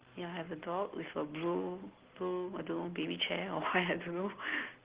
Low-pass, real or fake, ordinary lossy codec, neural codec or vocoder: 3.6 kHz; real; Opus, 16 kbps; none